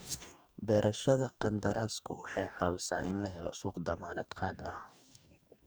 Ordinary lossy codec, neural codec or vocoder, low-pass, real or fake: none; codec, 44.1 kHz, 2.6 kbps, DAC; none; fake